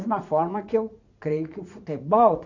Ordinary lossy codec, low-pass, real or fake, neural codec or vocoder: AAC, 48 kbps; 7.2 kHz; fake; vocoder, 44.1 kHz, 80 mel bands, Vocos